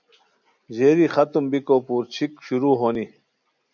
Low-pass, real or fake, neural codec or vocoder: 7.2 kHz; real; none